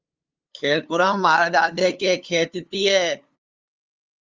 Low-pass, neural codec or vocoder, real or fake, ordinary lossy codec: 7.2 kHz; codec, 16 kHz, 2 kbps, FunCodec, trained on LibriTTS, 25 frames a second; fake; Opus, 32 kbps